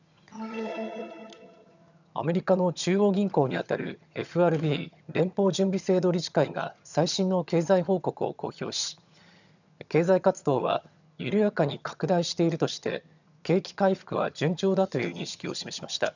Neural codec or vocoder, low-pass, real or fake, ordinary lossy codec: vocoder, 22.05 kHz, 80 mel bands, HiFi-GAN; 7.2 kHz; fake; none